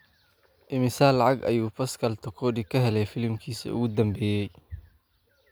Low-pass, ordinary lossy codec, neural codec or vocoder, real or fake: none; none; none; real